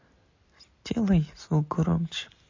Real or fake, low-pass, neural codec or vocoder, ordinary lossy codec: real; 7.2 kHz; none; MP3, 32 kbps